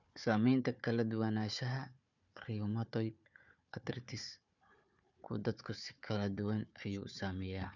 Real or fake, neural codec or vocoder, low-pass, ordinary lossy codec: fake; codec, 16 kHz, 4 kbps, FunCodec, trained on Chinese and English, 50 frames a second; 7.2 kHz; none